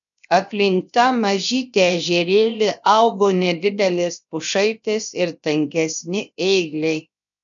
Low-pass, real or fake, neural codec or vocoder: 7.2 kHz; fake; codec, 16 kHz, 0.7 kbps, FocalCodec